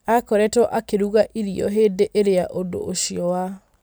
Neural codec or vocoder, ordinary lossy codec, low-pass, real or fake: none; none; none; real